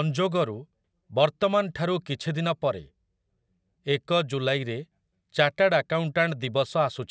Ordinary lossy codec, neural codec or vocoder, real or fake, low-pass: none; none; real; none